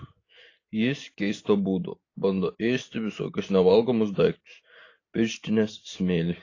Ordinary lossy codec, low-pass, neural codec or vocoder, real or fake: AAC, 32 kbps; 7.2 kHz; none; real